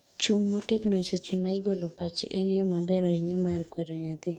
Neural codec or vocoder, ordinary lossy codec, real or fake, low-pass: codec, 44.1 kHz, 2.6 kbps, DAC; none; fake; 19.8 kHz